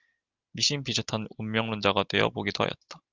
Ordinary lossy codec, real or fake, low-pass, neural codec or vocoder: Opus, 24 kbps; real; 7.2 kHz; none